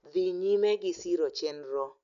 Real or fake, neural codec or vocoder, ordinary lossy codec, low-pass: real; none; none; 7.2 kHz